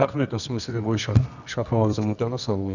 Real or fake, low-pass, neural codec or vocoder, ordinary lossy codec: fake; 7.2 kHz; codec, 24 kHz, 0.9 kbps, WavTokenizer, medium music audio release; none